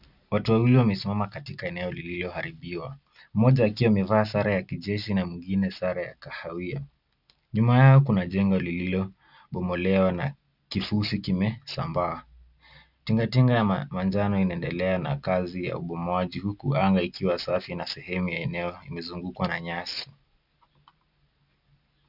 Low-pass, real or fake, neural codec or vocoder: 5.4 kHz; real; none